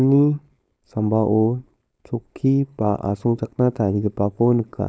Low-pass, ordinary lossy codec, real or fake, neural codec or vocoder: none; none; fake; codec, 16 kHz, 4.8 kbps, FACodec